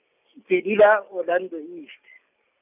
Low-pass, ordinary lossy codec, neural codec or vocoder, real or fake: 3.6 kHz; none; none; real